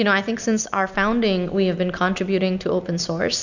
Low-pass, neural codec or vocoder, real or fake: 7.2 kHz; none; real